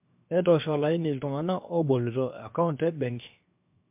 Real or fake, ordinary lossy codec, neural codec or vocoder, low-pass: fake; MP3, 32 kbps; codec, 16 kHz, 0.7 kbps, FocalCodec; 3.6 kHz